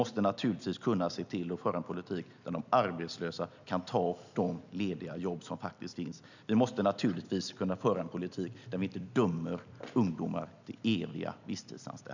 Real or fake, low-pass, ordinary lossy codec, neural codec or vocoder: real; 7.2 kHz; none; none